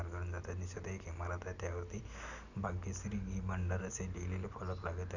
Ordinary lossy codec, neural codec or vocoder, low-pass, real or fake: none; none; 7.2 kHz; real